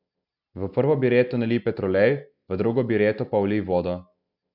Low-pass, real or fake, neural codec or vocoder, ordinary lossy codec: 5.4 kHz; real; none; none